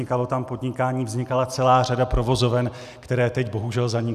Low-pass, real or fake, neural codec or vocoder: 14.4 kHz; real; none